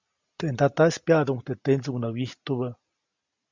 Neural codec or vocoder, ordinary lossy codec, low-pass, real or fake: none; Opus, 64 kbps; 7.2 kHz; real